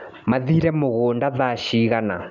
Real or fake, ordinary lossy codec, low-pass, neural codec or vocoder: real; none; 7.2 kHz; none